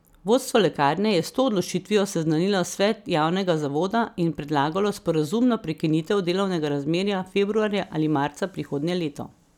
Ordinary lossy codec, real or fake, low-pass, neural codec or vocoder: none; real; 19.8 kHz; none